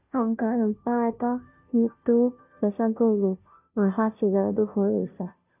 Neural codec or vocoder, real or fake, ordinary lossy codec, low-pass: codec, 16 kHz, 0.5 kbps, FunCodec, trained on Chinese and English, 25 frames a second; fake; none; 3.6 kHz